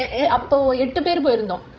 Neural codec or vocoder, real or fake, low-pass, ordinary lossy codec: codec, 16 kHz, 16 kbps, FreqCodec, larger model; fake; none; none